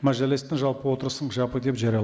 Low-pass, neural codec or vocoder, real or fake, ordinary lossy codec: none; none; real; none